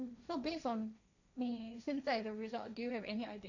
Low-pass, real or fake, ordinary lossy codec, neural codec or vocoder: 7.2 kHz; fake; none; codec, 16 kHz, 1.1 kbps, Voila-Tokenizer